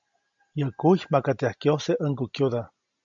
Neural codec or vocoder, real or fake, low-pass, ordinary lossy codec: none; real; 7.2 kHz; MP3, 64 kbps